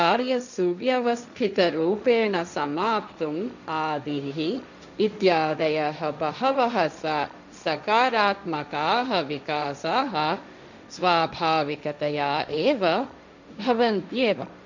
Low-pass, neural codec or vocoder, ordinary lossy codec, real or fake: 7.2 kHz; codec, 16 kHz, 1.1 kbps, Voila-Tokenizer; none; fake